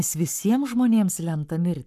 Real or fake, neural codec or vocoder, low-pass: fake; codec, 44.1 kHz, 7.8 kbps, Pupu-Codec; 14.4 kHz